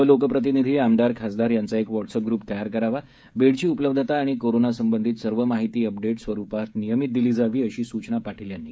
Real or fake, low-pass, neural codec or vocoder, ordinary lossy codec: fake; none; codec, 16 kHz, 16 kbps, FreqCodec, smaller model; none